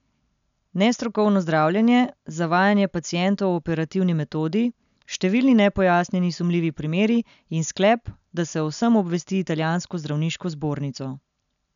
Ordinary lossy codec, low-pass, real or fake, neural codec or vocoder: none; 7.2 kHz; real; none